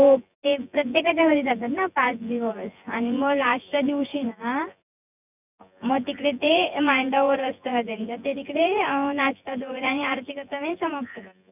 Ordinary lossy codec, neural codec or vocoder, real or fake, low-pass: none; vocoder, 24 kHz, 100 mel bands, Vocos; fake; 3.6 kHz